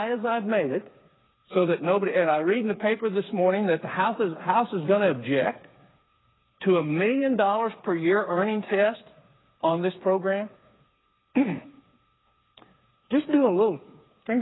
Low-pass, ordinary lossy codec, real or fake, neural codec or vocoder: 7.2 kHz; AAC, 16 kbps; fake; codec, 16 kHz, 4 kbps, FreqCodec, smaller model